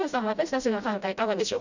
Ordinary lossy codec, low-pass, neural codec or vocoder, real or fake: none; 7.2 kHz; codec, 16 kHz, 0.5 kbps, FreqCodec, smaller model; fake